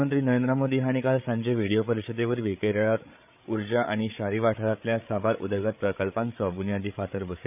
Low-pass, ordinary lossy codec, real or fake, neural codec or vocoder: 3.6 kHz; none; fake; codec, 16 kHz, 8 kbps, FreqCodec, larger model